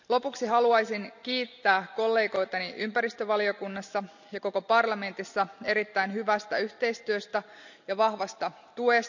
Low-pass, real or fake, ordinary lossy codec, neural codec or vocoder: 7.2 kHz; real; none; none